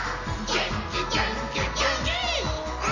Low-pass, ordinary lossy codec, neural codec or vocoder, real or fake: 7.2 kHz; none; none; real